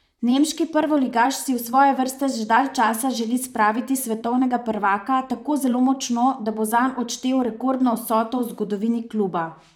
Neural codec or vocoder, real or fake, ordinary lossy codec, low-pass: vocoder, 44.1 kHz, 128 mel bands, Pupu-Vocoder; fake; none; 19.8 kHz